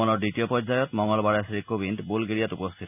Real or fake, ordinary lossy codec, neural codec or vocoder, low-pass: real; none; none; 3.6 kHz